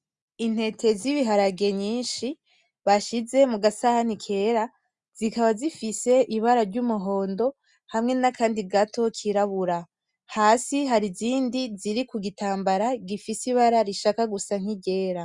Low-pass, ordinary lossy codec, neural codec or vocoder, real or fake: 10.8 kHz; Opus, 64 kbps; none; real